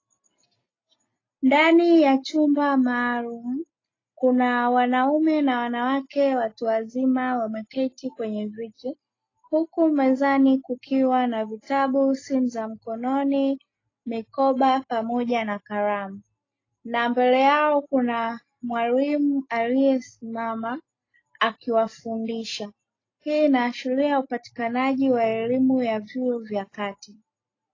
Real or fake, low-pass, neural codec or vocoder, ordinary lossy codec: real; 7.2 kHz; none; AAC, 32 kbps